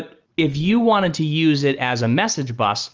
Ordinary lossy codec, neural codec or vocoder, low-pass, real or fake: Opus, 24 kbps; none; 7.2 kHz; real